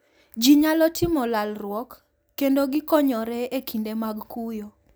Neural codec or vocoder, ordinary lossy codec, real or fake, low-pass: none; none; real; none